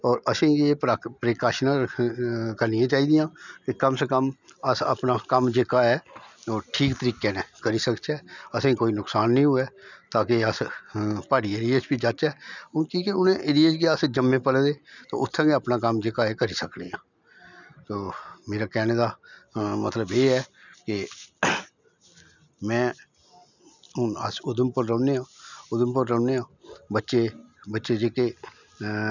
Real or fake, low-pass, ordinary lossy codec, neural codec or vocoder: real; 7.2 kHz; none; none